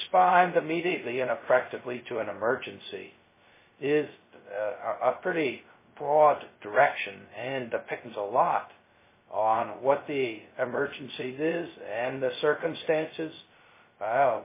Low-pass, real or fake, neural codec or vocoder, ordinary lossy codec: 3.6 kHz; fake; codec, 16 kHz, 0.2 kbps, FocalCodec; MP3, 16 kbps